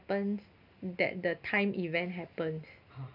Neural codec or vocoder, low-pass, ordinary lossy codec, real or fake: none; 5.4 kHz; none; real